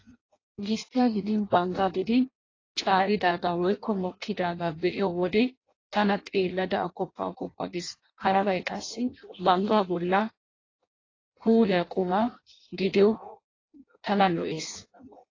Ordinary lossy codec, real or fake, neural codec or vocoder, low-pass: AAC, 32 kbps; fake; codec, 16 kHz in and 24 kHz out, 0.6 kbps, FireRedTTS-2 codec; 7.2 kHz